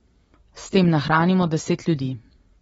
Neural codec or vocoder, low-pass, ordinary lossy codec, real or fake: none; 19.8 kHz; AAC, 24 kbps; real